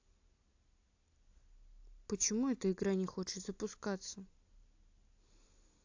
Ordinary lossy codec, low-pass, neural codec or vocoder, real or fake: AAC, 48 kbps; 7.2 kHz; none; real